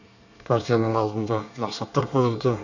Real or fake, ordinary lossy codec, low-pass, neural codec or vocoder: fake; none; 7.2 kHz; codec, 24 kHz, 1 kbps, SNAC